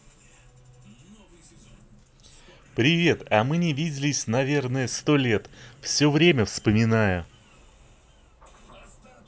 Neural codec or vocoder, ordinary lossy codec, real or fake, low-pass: none; none; real; none